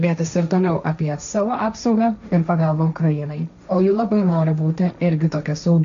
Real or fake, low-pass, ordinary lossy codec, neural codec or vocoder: fake; 7.2 kHz; MP3, 64 kbps; codec, 16 kHz, 1.1 kbps, Voila-Tokenizer